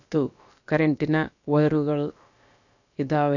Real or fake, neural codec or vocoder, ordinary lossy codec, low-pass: fake; codec, 16 kHz, about 1 kbps, DyCAST, with the encoder's durations; none; 7.2 kHz